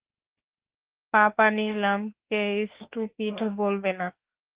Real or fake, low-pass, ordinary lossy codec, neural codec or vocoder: fake; 3.6 kHz; Opus, 16 kbps; autoencoder, 48 kHz, 32 numbers a frame, DAC-VAE, trained on Japanese speech